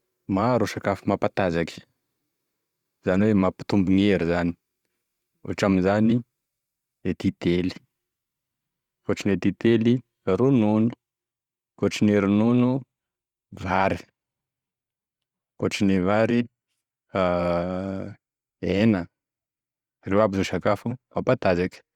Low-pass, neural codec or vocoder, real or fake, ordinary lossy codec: 19.8 kHz; vocoder, 44.1 kHz, 128 mel bands every 512 samples, BigVGAN v2; fake; Opus, 64 kbps